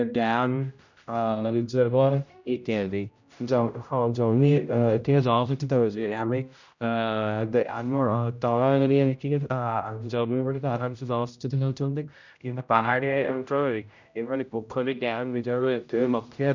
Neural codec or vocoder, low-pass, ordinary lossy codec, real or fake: codec, 16 kHz, 0.5 kbps, X-Codec, HuBERT features, trained on general audio; 7.2 kHz; none; fake